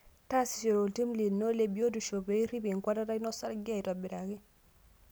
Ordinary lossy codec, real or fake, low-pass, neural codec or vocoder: none; real; none; none